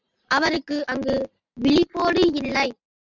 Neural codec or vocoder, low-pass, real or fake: none; 7.2 kHz; real